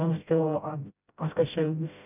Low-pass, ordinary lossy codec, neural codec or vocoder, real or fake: 3.6 kHz; none; codec, 16 kHz, 0.5 kbps, FreqCodec, smaller model; fake